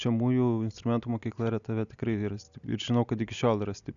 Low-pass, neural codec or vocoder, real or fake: 7.2 kHz; none; real